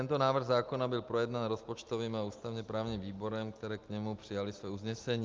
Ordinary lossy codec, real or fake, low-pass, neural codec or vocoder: Opus, 24 kbps; real; 7.2 kHz; none